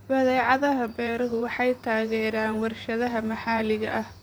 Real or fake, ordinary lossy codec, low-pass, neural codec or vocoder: fake; none; none; vocoder, 44.1 kHz, 128 mel bands, Pupu-Vocoder